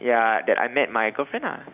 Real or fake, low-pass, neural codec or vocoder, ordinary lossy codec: real; 3.6 kHz; none; none